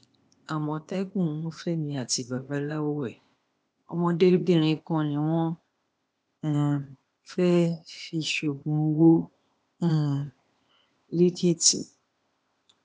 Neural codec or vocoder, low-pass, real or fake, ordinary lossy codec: codec, 16 kHz, 0.8 kbps, ZipCodec; none; fake; none